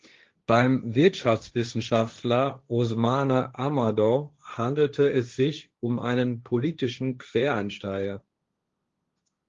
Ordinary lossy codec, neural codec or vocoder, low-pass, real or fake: Opus, 32 kbps; codec, 16 kHz, 1.1 kbps, Voila-Tokenizer; 7.2 kHz; fake